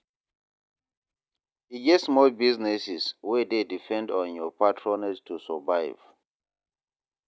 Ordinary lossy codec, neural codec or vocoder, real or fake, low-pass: none; none; real; none